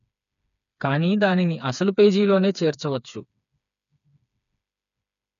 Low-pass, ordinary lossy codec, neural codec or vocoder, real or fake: 7.2 kHz; MP3, 96 kbps; codec, 16 kHz, 4 kbps, FreqCodec, smaller model; fake